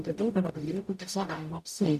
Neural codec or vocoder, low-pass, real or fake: codec, 44.1 kHz, 0.9 kbps, DAC; 14.4 kHz; fake